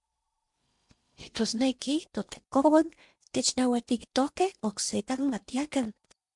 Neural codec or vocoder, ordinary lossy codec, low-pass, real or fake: codec, 16 kHz in and 24 kHz out, 0.8 kbps, FocalCodec, streaming, 65536 codes; MP3, 64 kbps; 10.8 kHz; fake